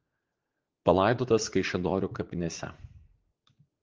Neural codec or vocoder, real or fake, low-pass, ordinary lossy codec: vocoder, 44.1 kHz, 80 mel bands, Vocos; fake; 7.2 kHz; Opus, 16 kbps